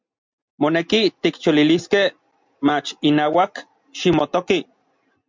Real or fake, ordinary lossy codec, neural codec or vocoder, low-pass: real; MP3, 64 kbps; none; 7.2 kHz